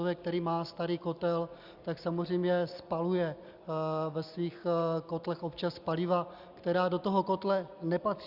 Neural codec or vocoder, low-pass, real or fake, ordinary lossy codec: none; 5.4 kHz; real; Opus, 64 kbps